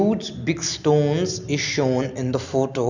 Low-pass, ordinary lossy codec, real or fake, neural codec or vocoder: 7.2 kHz; none; real; none